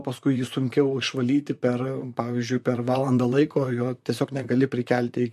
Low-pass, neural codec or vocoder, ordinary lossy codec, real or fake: 14.4 kHz; vocoder, 44.1 kHz, 128 mel bands, Pupu-Vocoder; MP3, 64 kbps; fake